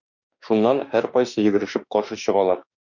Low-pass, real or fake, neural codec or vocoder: 7.2 kHz; fake; autoencoder, 48 kHz, 32 numbers a frame, DAC-VAE, trained on Japanese speech